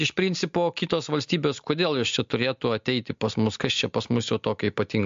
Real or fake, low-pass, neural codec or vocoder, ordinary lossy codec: real; 7.2 kHz; none; MP3, 48 kbps